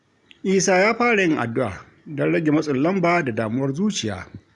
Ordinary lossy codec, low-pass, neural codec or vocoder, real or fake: none; 10.8 kHz; none; real